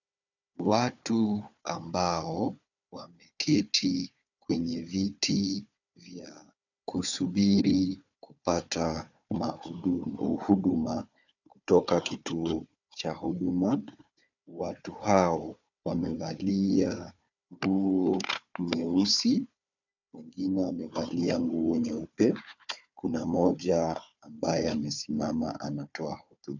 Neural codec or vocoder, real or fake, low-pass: codec, 16 kHz, 4 kbps, FunCodec, trained on Chinese and English, 50 frames a second; fake; 7.2 kHz